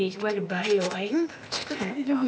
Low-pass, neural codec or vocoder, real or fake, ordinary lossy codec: none; codec, 16 kHz, 0.8 kbps, ZipCodec; fake; none